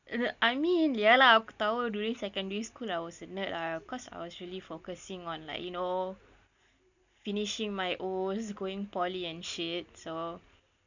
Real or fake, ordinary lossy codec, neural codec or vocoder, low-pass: real; none; none; 7.2 kHz